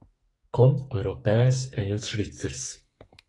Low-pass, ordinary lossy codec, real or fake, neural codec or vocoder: 10.8 kHz; AAC, 32 kbps; fake; codec, 44.1 kHz, 2.6 kbps, SNAC